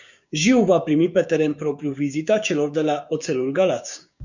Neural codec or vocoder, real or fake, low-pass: codec, 16 kHz, 6 kbps, DAC; fake; 7.2 kHz